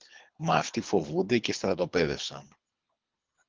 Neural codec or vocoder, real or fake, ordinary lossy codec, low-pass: codec, 24 kHz, 3 kbps, HILCodec; fake; Opus, 32 kbps; 7.2 kHz